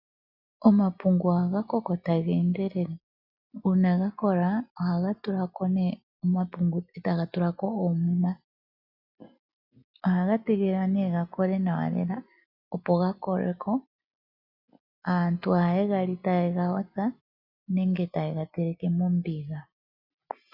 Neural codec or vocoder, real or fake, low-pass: none; real; 5.4 kHz